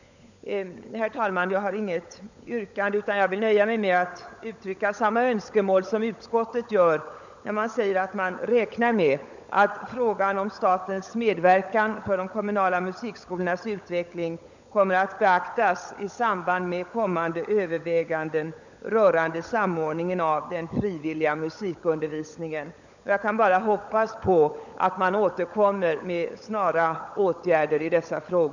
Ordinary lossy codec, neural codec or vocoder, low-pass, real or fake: none; codec, 16 kHz, 16 kbps, FunCodec, trained on LibriTTS, 50 frames a second; 7.2 kHz; fake